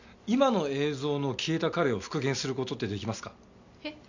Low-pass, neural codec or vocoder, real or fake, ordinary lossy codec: 7.2 kHz; none; real; none